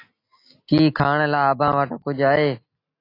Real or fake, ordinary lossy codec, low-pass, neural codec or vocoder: real; AAC, 32 kbps; 5.4 kHz; none